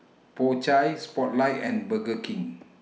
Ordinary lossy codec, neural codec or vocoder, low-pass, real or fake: none; none; none; real